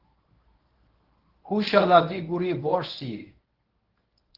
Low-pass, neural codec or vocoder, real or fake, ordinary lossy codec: 5.4 kHz; codec, 24 kHz, 0.9 kbps, WavTokenizer, medium speech release version 1; fake; Opus, 16 kbps